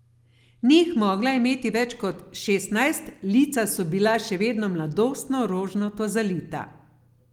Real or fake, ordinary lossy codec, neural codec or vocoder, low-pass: real; Opus, 24 kbps; none; 19.8 kHz